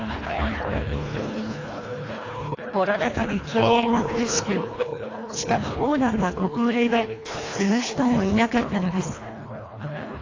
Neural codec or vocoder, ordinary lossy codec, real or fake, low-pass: codec, 24 kHz, 1.5 kbps, HILCodec; AAC, 32 kbps; fake; 7.2 kHz